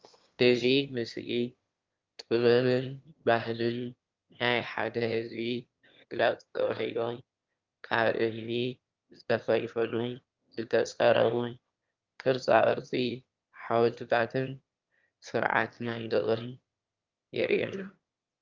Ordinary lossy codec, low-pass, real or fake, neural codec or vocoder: Opus, 24 kbps; 7.2 kHz; fake; autoencoder, 22.05 kHz, a latent of 192 numbers a frame, VITS, trained on one speaker